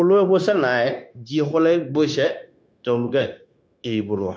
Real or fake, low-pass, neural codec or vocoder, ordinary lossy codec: fake; none; codec, 16 kHz, 0.9 kbps, LongCat-Audio-Codec; none